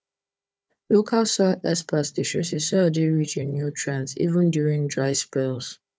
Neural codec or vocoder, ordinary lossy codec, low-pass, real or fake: codec, 16 kHz, 4 kbps, FunCodec, trained on Chinese and English, 50 frames a second; none; none; fake